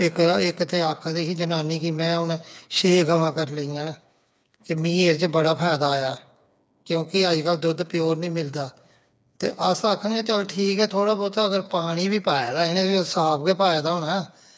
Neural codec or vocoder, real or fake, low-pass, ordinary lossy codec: codec, 16 kHz, 4 kbps, FreqCodec, smaller model; fake; none; none